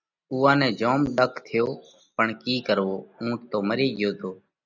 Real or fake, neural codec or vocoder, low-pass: real; none; 7.2 kHz